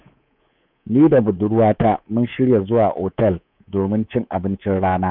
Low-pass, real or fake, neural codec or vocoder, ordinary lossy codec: 5.4 kHz; fake; codec, 24 kHz, 3.1 kbps, DualCodec; none